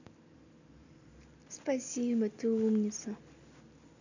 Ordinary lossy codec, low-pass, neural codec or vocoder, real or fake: none; 7.2 kHz; none; real